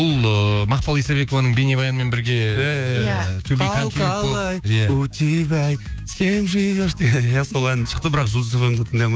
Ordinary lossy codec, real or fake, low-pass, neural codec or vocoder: none; fake; none; codec, 16 kHz, 6 kbps, DAC